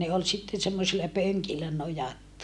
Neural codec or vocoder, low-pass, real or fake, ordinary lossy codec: none; none; real; none